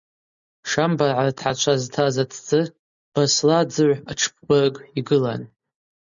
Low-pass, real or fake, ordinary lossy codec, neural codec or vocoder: 7.2 kHz; real; MP3, 96 kbps; none